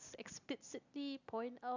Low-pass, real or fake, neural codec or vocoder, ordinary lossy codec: 7.2 kHz; real; none; none